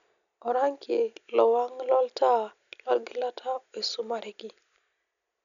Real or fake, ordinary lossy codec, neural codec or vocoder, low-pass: real; none; none; 7.2 kHz